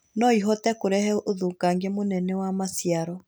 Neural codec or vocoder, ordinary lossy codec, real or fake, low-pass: none; none; real; none